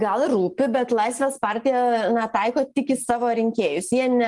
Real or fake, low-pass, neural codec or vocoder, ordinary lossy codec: real; 10.8 kHz; none; Opus, 24 kbps